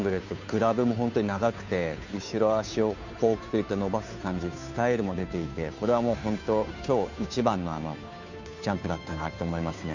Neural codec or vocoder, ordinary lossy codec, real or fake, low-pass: codec, 16 kHz, 2 kbps, FunCodec, trained on Chinese and English, 25 frames a second; none; fake; 7.2 kHz